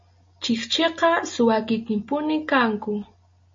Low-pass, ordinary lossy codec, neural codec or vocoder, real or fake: 7.2 kHz; MP3, 32 kbps; none; real